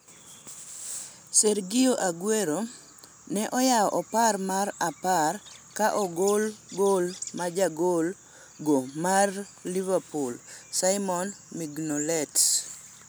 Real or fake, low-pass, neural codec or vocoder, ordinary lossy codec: real; none; none; none